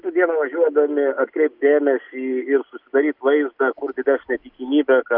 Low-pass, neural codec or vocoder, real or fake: 5.4 kHz; none; real